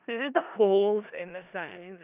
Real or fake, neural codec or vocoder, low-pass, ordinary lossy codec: fake; codec, 16 kHz in and 24 kHz out, 0.4 kbps, LongCat-Audio-Codec, four codebook decoder; 3.6 kHz; none